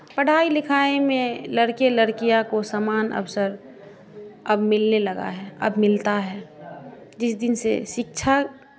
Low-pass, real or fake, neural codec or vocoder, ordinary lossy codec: none; real; none; none